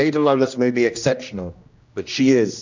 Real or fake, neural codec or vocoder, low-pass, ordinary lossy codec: fake; codec, 16 kHz, 1 kbps, X-Codec, HuBERT features, trained on general audio; 7.2 kHz; MP3, 64 kbps